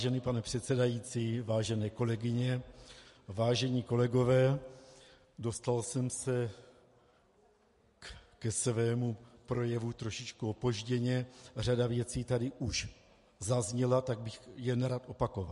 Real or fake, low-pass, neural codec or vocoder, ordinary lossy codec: real; 14.4 kHz; none; MP3, 48 kbps